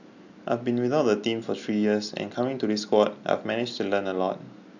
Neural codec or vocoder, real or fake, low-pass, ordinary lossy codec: none; real; 7.2 kHz; none